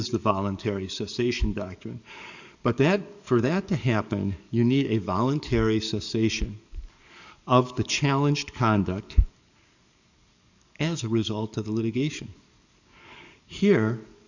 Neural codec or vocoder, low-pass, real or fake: codec, 44.1 kHz, 7.8 kbps, DAC; 7.2 kHz; fake